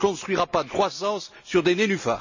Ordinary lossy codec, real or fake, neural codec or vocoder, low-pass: none; real; none; 7.2 kHz